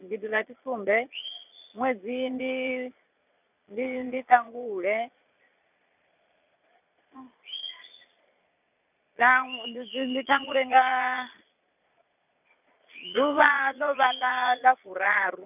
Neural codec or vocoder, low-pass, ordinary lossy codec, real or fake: vocoder, 22.05 kHz, 80 mel bands, Vocos; 3.6 kHz; none; fake